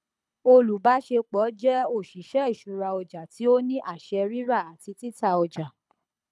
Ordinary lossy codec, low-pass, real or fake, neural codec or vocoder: none; none; fake; codec, 24 kHz, 6 kbps, HILCodec